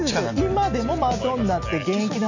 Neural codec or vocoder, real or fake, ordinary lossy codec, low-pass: none; real; none; 7.2 kHz